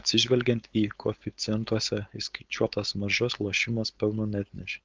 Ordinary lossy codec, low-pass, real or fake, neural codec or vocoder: Opus, 32 kbps; 7.2 kHz; fake; codec, 16 kHz, 4.8 kbps, FACodec